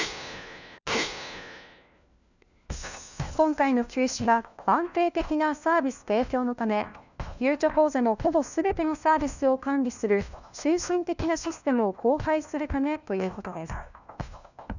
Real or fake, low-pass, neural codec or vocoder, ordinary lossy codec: fake; 7.2 kHz; codec, 16 kHz, 1 kbps, FunCodec, trained on LibriTTS, 50 frames a second; none